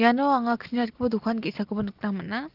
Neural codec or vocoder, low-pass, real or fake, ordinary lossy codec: none; 5.4 kHz; real; Opus, 16 kbps